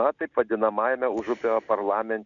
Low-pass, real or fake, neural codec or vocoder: 7.2 kHz; real; none